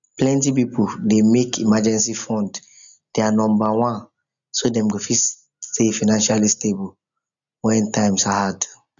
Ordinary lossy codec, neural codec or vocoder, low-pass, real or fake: MP3, 96 kbps; none; 7.2 kHz; real